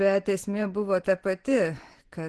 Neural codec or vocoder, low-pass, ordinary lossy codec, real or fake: vocoder, 24 kHz, 100 mel bands, Vocos; 10.8 kHz; Opus, 16 kbps; fake